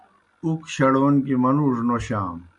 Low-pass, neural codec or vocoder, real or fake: 10.8 kHz; none; real